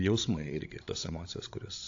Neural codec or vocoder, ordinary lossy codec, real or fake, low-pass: codec, 16 kHz, 16 kbps, FreqCodec, larger model; AAC, 48 kbps; fake; 7.2 kHz